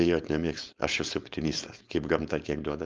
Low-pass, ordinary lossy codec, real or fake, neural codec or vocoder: 7.2 kHz; Opus, 24 kbps; fake; codec, 16 kHz, 4.8 kbps, FACodec